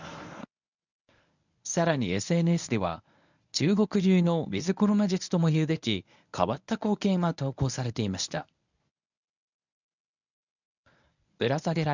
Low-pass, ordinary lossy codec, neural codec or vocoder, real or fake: 7.2 kHz; none; codec, 24 kHz, 0.9 kbps, WavTokenizer, medium speech release version 1; fake